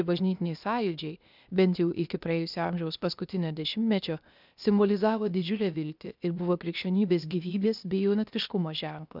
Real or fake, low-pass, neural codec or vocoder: fake; 5.4 kHz; codec, 16 kHz, 0.8 kbps, ZipCodec